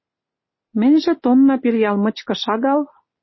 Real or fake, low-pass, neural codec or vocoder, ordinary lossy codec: real; 7.2 kHz; none; MP3, 24 kbps